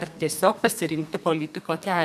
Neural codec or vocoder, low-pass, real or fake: codec, 32 kHz, 1.9 kbps, SNAC; 14.4 kHz; fake